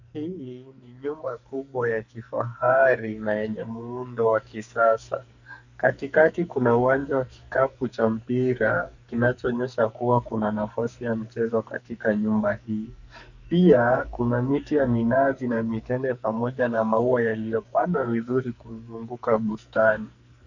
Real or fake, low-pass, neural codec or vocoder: fake; 7.2 kHz; codec, 44.1 kHz, 2.6 kbps, SNAC